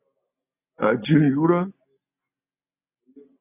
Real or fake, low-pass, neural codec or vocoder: real; 3.6 kHz; none